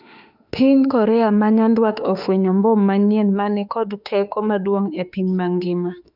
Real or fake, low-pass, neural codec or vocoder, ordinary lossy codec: fake; 5.4 kHz; autoencoder, 48 kHz, 32 numbers a frame, DAC-VAE, trained on Japanese speech; none